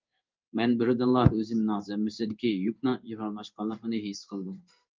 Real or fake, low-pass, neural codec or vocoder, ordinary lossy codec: fake; 7.2 kHz; codec, 16 kHz in and 24 kHz out, 1 kbps, XY-Tokenizer; Opus, 24 kbps